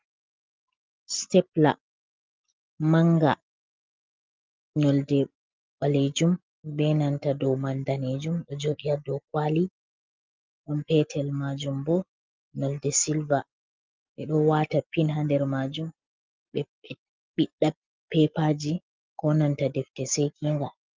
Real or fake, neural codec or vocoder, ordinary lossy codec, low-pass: real; none; Opus, 32 kbps; 7.2 kHz